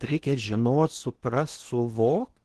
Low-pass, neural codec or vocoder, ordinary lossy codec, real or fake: 10.8 kHz; codec, 16 kHz in and 24 kHz out, 0.8 kbps, FocalCodec, streaming, 65536 codes; Opus, 16 kbps; fake